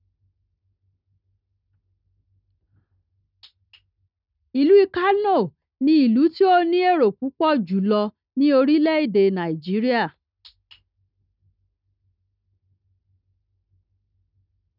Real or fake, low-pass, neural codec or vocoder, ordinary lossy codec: real; 5.4 kHz; none; none